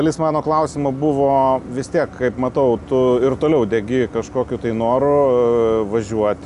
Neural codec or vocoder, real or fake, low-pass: none; real; 10.8 kHz